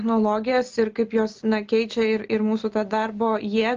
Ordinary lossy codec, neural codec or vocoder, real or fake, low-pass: Opus, 32 kbps; none; real; 7.2 kHz